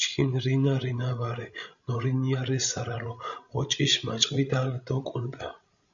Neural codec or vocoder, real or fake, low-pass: codec, 16 kHz, 16 kbps, FreqCodec, larger model; fake; 7.2 kHz